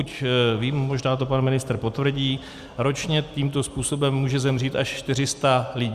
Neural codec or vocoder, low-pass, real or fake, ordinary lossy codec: none; 14.4 kHz; real; Opus, 64 kbps